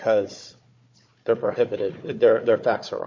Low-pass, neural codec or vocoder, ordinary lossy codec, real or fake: 7.2 kHz; codec, 16 kHz, 4 kbps, FunCodec, trained on Chinese and English, 50 frames a second; MP3, 48 kbps; fake